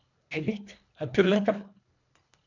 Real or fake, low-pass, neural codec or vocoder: fake; 7.2 kHz; codec, 24 kHz, 1.5 kbps, HILCodec